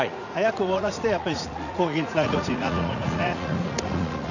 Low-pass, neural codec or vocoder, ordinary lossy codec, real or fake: 7.2 kHz; vocoder, 44.1 kHz, 80 mel bands, Vocos; none; fake